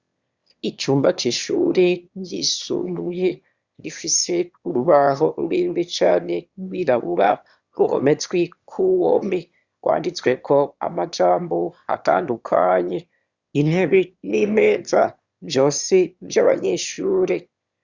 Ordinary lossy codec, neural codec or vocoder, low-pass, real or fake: Opus, 64 kbps; autoencoder, 22.05 kHz, a latent of 192 numbers a frame, VITS, trained on one speaker; 7.2 kHz; fake